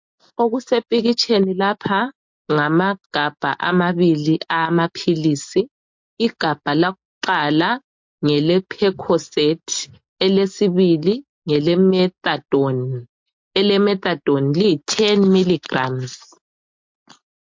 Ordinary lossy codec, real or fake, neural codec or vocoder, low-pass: MP3, 48 kbps; real; none; 7.2 kHz